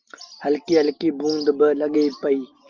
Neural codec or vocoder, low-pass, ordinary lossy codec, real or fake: none; 7.2 kHz; Opus, 32 kbps; real